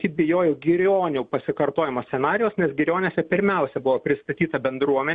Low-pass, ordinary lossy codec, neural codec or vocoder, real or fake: 9.9 kHz; Opus, 64 kbps; none; real